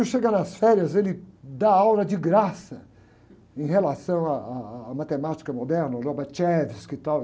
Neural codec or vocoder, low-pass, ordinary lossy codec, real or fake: none; none; none; real